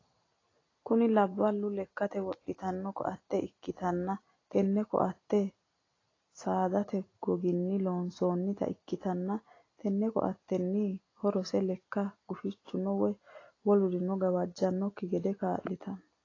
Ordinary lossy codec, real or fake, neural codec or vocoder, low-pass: AAC, 32 kbps; real; none; 7.2 kHz